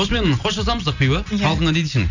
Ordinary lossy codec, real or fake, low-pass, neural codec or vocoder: none; real; 7.2 kHz; none